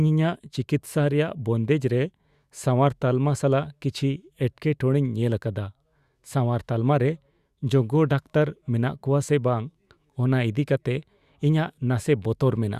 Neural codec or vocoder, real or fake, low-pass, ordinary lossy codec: autoencoder, 48 kHz, 128 numbers a frame, DAC-VAE, trained on Japanese speech; fake; 14.4 kHz; Opus, 64 kbps